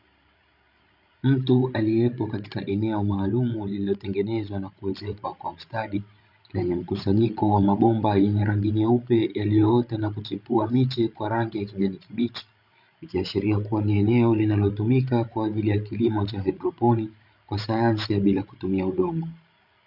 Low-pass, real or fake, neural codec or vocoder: 5.4 kHz; fake; codec, 16 kHz, 16 kbps, FreqCodec, larger model